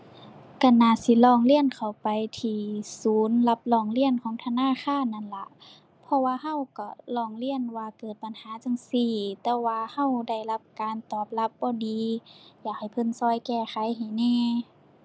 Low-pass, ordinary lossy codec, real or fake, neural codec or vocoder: none; none; real; none